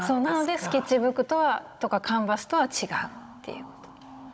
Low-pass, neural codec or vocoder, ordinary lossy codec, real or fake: none; codec, 16 kHz, 16 kbps, FunCodec, trained on Chinese and English, 50 frames a second; none; fake